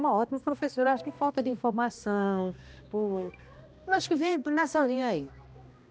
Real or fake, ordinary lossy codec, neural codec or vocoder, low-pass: fake; none; codec, 16 kHz, 1 kbps, X-Codec, HuBERT features, trained on balanced general audio; none